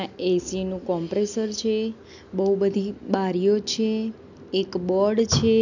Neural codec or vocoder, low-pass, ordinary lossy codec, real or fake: none; 7.2 kHz; none; real